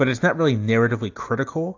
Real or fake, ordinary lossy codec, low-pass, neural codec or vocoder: real; AAC, 48 kbps; 7.2 kHz; none